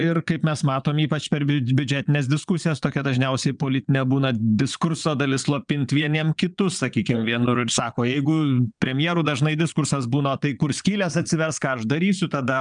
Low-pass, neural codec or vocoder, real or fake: 9.9 kHz; vocoder, 22.05 kHz, 80 mel bands, Vocos; fake